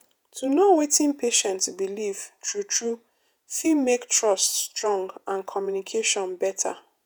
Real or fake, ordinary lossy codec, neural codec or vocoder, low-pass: fake; none; vocoder, 48 kHz, 128 mel bands, Vocos; none